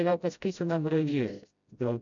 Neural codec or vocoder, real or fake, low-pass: codec, 16 kHz, 0.5 kbps, FreqCodec, smaller model; fake; 7.2 kHz